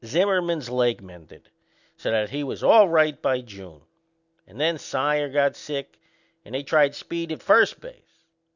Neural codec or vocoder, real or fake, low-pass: none; real; 7.2 kHz